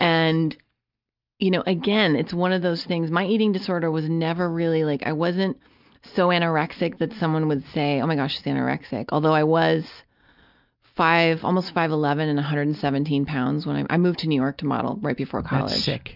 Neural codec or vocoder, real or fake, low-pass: none; real; 5.4 kHz